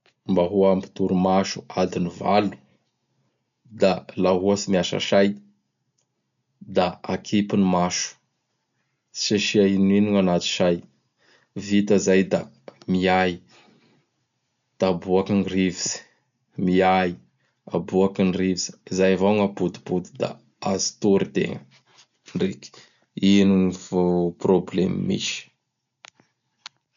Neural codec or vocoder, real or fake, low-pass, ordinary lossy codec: none; real; 7.2 kHz; none